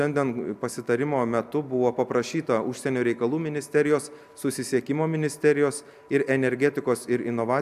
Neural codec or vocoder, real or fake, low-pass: none; real; 14.4 kHz